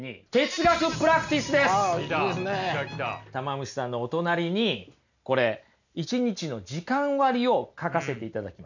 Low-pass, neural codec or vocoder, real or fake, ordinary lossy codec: 7.2 kHz; none; real; none